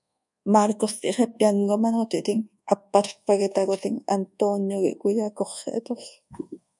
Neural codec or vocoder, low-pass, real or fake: codec, 24 kHz, 1.2 kbps, DualCodec; 10.8 kHz; fake